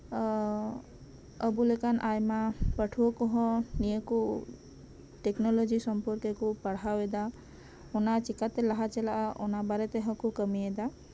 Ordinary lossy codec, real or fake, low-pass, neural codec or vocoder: none; real; none; none